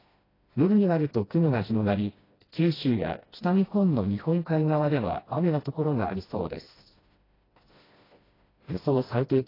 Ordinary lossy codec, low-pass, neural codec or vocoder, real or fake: AAC, 24 kbps; 5.4 kHz; codec, 16 kHz, 1 kbps, FreqCodec, smaller model; fake